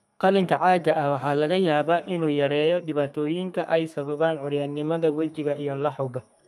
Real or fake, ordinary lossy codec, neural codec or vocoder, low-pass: fake; none; codec, 32 kHz, 1.9 kbps, SNAC; 14.4 kHz